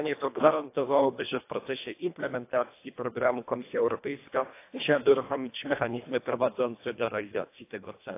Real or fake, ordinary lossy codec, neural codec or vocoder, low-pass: fake; AAC, 24 kbps; codec, 24 kHz, 1.5 kbps, HILCodec; 3.6 kHz